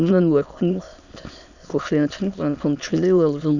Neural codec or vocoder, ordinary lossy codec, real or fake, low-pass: autoencoder, 22.05 kHz, a latent of 192 numbers a frame, VITS, trained on many speakers; none; fake; 7.2 kHz